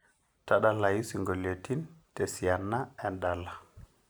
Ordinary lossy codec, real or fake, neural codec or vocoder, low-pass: none; real; none; none